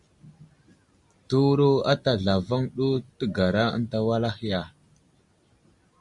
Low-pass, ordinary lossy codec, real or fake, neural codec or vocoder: 10.8 kHz; Opus, 64 kbps; real; none